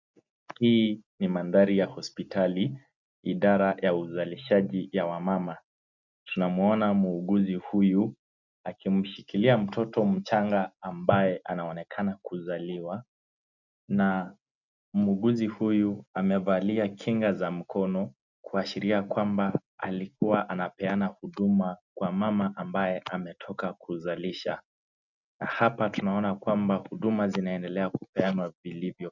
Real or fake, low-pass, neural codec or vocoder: real; 7.2 kHz; none